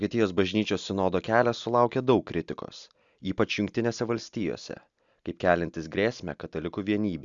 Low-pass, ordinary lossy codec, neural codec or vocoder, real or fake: 7.2 kHz; Opus, 64 kbps; none; real